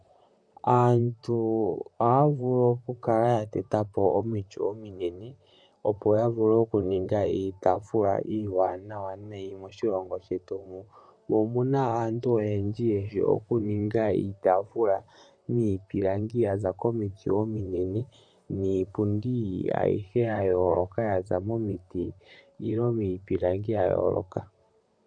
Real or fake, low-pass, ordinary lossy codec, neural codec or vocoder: fake; 9.9 kHz; MP3, 96 kbps; vocoder, 44.1 kHz, 128 mel bands, Pupu-Vocoder